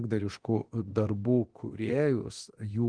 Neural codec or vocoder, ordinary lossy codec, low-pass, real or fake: codec, 24 kHz, 0.9 kbps, DualCodec; Opus, 16 kbps; 9.9 kHz; fake